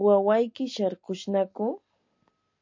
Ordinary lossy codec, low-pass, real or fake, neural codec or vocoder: MP3, 48 kbps; 7.2 kHz; real; none